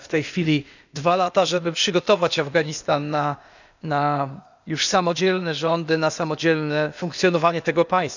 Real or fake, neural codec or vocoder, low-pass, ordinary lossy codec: fake; codec, 16 kHz, 0.8 kbps, ZipCodec; 7.2 kHz; none